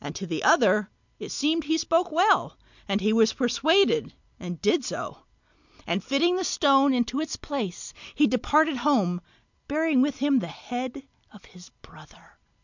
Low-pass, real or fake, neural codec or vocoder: 7.2 kHz; real; none